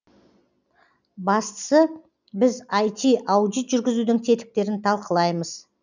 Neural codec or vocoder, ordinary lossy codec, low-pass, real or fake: none; none; 7.2 kHz; real